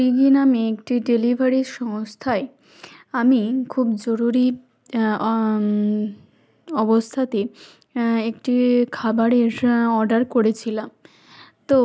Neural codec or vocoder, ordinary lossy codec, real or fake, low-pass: none; none; real; none